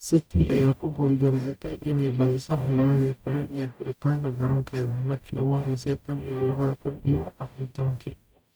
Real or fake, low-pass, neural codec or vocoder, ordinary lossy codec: fake; none; codec, 44.1 kHz, 0.9 kbps, DAC; none